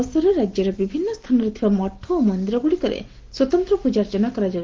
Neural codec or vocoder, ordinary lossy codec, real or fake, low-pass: none; Opus, 16 kbps; real; 7.2 kHz